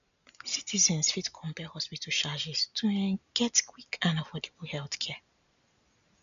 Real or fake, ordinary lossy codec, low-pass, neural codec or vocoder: real; none; 7.2 kHz; none